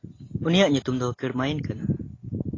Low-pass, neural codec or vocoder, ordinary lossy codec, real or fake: 7.2 kHz; none; AAC, 32 kbps; real